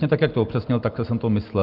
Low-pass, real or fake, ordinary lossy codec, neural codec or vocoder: 5.4 kHz; real; Opus, 32 kbps; none